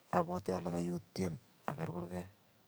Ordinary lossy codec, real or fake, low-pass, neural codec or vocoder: none; fake; none; codec, 44.1 kHz, 2.6 kbps, SNAC